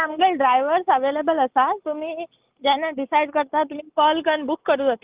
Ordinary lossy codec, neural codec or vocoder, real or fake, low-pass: Opus, 32 kbps; none; real; 3.6 kHz